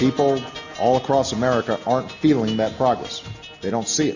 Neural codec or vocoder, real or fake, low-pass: none; real; 7.2 kHz